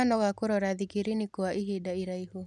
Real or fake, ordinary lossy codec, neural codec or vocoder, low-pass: real; none; none; none